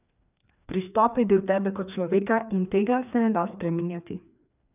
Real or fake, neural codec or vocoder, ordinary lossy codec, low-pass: fake; codec, 16 kHz, 2 kbps, FreqCodec, larger model; none; 3.6 kHz